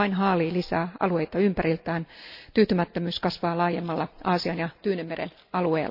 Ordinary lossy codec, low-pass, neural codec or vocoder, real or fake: none; 5.4 kHz; none; real